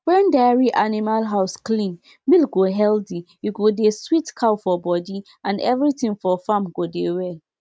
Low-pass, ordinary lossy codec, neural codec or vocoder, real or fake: none; none; none; real